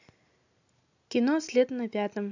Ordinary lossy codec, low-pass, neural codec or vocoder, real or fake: AAC, 48 kbps; 7.2 kHz; none; real